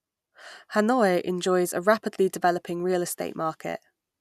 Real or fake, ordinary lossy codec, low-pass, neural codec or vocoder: real; none; 14.4 kHz; none